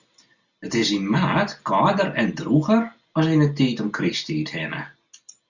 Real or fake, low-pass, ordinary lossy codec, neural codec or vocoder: real; 7.2 kHz; Opus, 64 kbps; none